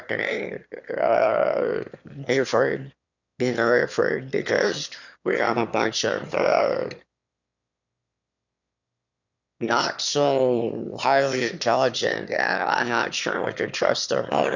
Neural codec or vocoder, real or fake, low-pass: autoencoder, 22.05 kHz, a latent of 192 numbers a frame, VITS, trained on one speaker; fake; 7.2 kHz